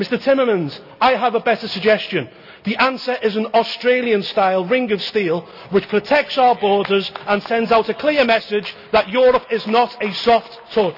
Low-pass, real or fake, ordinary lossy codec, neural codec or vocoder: 5.4 kHz; real; none; none